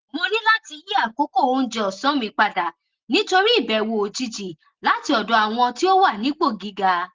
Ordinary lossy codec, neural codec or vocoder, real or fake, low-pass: Opus, 16 kbps; none; real; 7.2 kHz